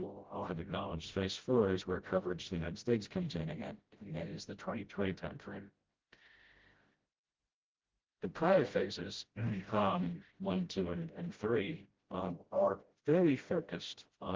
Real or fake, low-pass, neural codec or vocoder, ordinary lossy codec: fake; 7.2 kHz; codec, 16 kHz, 0.5 kbps, FreqCodec, smaller model; Opus, 16 kbps